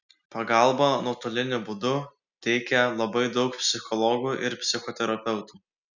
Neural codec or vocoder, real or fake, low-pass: none; real; 7.2 kHz